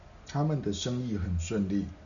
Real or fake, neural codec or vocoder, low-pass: real; none; 7.2 kHz